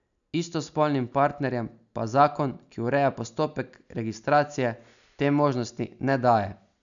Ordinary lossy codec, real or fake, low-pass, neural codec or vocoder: none; real; 7.2 kHz; none